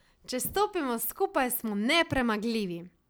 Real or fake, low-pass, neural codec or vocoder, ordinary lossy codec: real; none; none; none